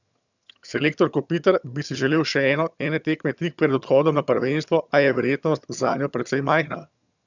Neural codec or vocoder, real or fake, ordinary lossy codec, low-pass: vocoder, 22.05 kHz, 80 mel bands, HiFi-GAN; fake; none; 7.2 kHz